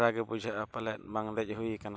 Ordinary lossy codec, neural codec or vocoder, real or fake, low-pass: none; none; real; none